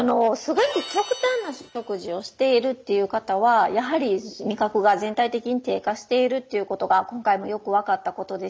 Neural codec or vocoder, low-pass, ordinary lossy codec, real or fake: none; none; none; real